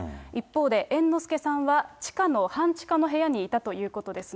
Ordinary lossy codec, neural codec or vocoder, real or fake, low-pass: none; none; real; none